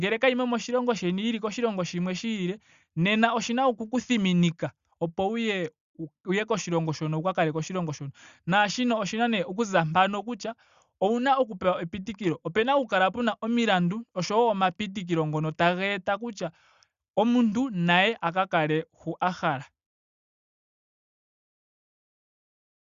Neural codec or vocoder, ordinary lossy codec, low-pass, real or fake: none; Opus, 64 kbps; 7.2 kHz; real